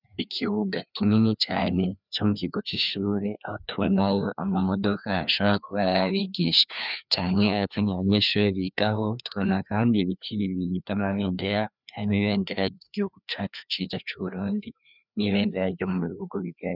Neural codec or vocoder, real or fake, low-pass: codec, 16 kHz, 2 kbps, FreqCodec, larger model; fake; 5.4 kHz